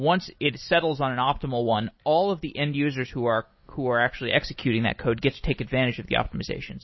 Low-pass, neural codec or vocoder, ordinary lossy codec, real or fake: 7.2 kHz; none; MP3, 24 kbps; real